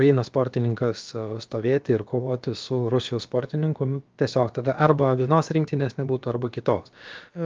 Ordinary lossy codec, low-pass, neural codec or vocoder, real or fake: Opus, 24 kbps; 7.2 kHz; codec, 16 kHz, about 1 kbps, DyCAST, with the encoder's durations; fake